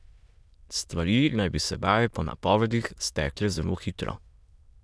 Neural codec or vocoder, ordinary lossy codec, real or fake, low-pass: autoencoder, 22.05 kHz, a latent of 192 numbers a frame, VITS, trained on many speakers; none; fake; none